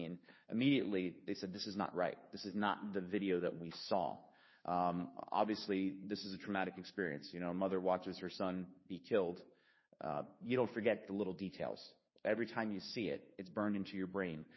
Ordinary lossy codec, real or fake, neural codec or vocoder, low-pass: MP3, 24 kbps; fake; codec, 16 kHz, 2 kbps, FunCodec, trained on Chinese and English, 25 frames a second; 7.2 kHz